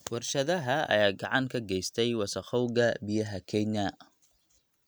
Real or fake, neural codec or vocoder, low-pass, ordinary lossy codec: real; none; none; none